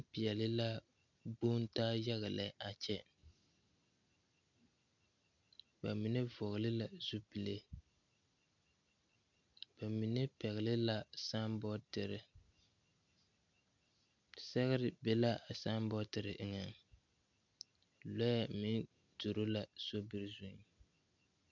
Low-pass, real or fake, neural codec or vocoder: 7.2 kHz; real; none